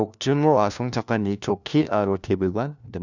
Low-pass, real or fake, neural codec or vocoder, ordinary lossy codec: 7.2 kHz; fake; codec, 16 kHz, 1 kbps, FunCodec, trained on LibriTTS, 50 frames a second; none